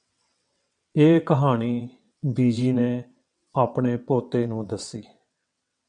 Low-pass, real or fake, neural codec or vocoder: 9.9 kHz; fake; vocoder, 22.05 kHz, 80 mel bands, WaveNeXt